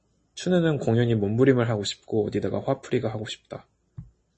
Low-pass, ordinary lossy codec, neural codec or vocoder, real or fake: 10.8 kHz; MP3, 32 kbps; none; real